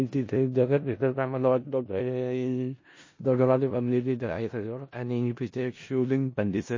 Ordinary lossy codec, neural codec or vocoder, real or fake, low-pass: MP3, 32 kbps; codec, 16 kHz in and 24 kHz out, 0.4 kbps, LongCat-Audio-Codec, four codebook decoder; fake; 7.2 kHz